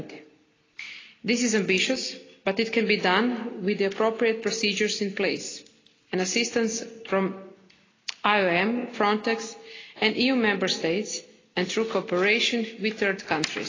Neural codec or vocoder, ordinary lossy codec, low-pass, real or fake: none; AAC, 32 kbps; 7.2 kHz; real